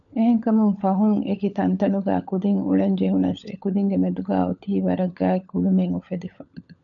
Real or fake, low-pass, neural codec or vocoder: fake; 7.2 kHz; codec, 16 kHz, 16 kbps, FunCodec, trained on LibriTTS, 50 frames a second